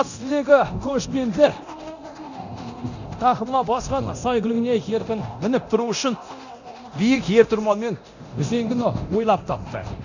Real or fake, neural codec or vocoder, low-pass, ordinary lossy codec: fake; codec, 24 kHz, 0.9 kbps, DualCodec; 7.2 kHz; none